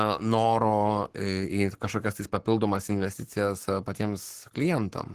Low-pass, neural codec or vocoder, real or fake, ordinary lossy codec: 14.4 kHz; codec, 44.1 kHz, 7.8 kbps, Pupu-Codec; fake; Opus, 16 kbps